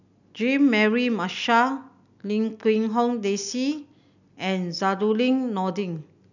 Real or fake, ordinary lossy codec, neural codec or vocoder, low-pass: real; none; none; 7.2 kHz